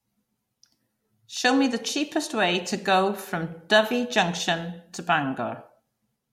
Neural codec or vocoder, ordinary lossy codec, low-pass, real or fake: none; MP3, 64 kbps; 19.8 kHz; real